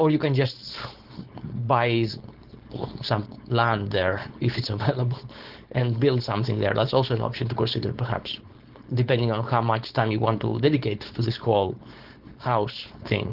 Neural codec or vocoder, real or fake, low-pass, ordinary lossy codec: codec, 16 kHz, 4.8 kbps, FACodec; fake; 5.4 kHz; Opus, 16 kbps